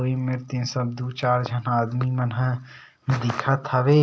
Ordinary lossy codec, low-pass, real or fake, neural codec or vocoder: none; none; real; none